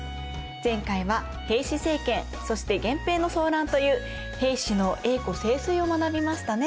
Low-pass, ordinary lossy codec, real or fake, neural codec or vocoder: none; none; real; none